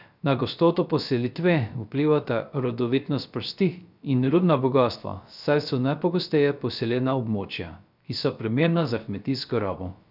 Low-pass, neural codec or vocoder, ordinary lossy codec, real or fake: 5.4 kHz; codec, 16 kHz, 0.3 kbps, FocalCodec; none; fake